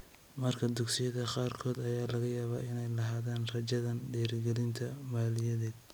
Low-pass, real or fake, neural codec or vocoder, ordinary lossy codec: none; real; none; none